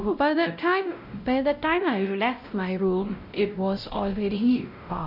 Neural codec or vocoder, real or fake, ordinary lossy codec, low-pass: codec, 16 kHz, 0.5 kbps, X-Codec, WavLM features, trained on Multilingual LibriSpeech; fake; none; 5.4 kHz